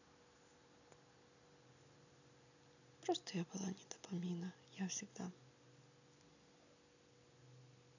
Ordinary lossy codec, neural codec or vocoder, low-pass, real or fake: none; none; 7.2 kHz; real